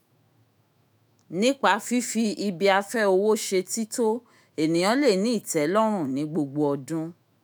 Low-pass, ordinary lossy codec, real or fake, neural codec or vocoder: none; none; fake; autoencoder, 48 kHz, 128 numbers a frame, DAC-VAE, trained on Japanese speech